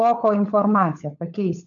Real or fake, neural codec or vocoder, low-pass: fake; codec, 16 kHz, 16 kbps, FunCodec, trained on LibriTTS, 50 frames a second; 7.2 kHz